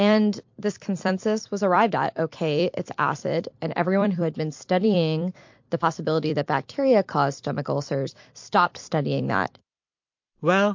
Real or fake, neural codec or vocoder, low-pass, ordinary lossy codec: fake; vocoder, 44.1 kHz, 128 mel bands every 256 samples, BigVGAN v2; 7.2 kHz; MP3, 48 kbps